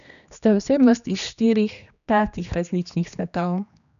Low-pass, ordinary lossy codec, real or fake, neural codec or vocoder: 7.2 kHz; none; fake; codec, 16 kHz, 2 kbps, X-Codec, HuBERT features, trained on general audio